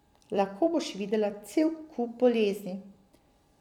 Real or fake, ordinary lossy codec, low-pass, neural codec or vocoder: fake; none; 19.8 kHz; vocoder, 44.1 kHz, 128 mel bands every 256 samples, BigVGAN v2